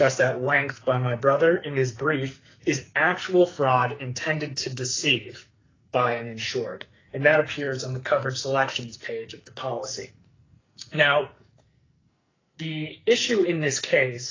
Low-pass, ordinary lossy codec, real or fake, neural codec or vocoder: 7.2 kHz; AAC, 32 kbps; fake; codec, 44.1 kHz, 2.6 kbps, SNAC